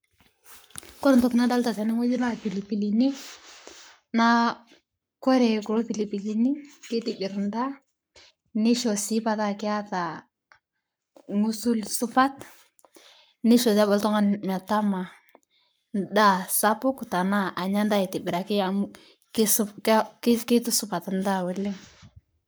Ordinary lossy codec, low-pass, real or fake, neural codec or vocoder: none; none; fake; codec, 44.1 kHz, 7.8 kbps, Pupu-Codec